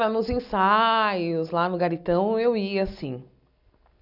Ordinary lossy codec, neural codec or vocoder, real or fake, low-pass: none; none; real; 5.4 kHz